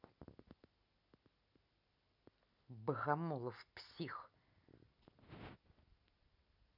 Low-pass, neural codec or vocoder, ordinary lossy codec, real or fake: 5.4 kHz; none; none; real